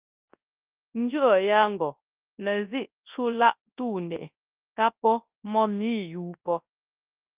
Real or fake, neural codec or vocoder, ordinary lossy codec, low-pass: fake; codec, 24 kHz, 0.9 kbps, WavTokenizer, large speech release; Opus, 24 kbps; 3.6 kHz